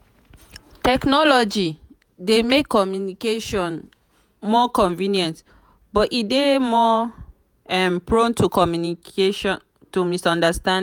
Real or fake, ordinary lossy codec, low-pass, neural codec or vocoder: fake; none; none; vocoder, 48 kHz, 128 mel bands, Vocos